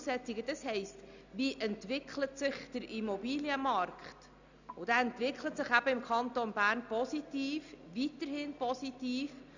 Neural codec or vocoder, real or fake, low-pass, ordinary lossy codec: none; real; 7.2 kHz; none